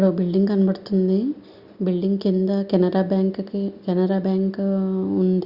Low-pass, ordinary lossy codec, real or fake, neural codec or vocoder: 5.4 kHz; Opus, 64 kbps; real; none